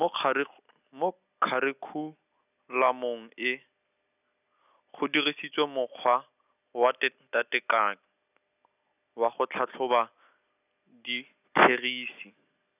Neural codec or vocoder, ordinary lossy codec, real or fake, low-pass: none; none; real; 3.6 kHz